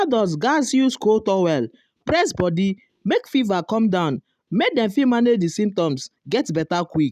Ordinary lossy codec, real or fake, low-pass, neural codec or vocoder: none; real; 14.4 kHz; none